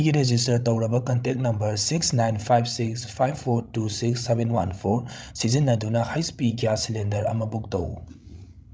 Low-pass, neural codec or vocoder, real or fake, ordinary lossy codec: none; codec, 16 kHz, 16 kbps, FreqCodec, larger model; fake; none